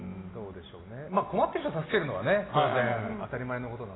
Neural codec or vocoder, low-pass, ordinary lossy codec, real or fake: none; 7.2 kHz; AAC, 16 kbps; real